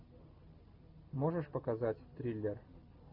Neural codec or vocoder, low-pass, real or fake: none; 5.4 kHz; real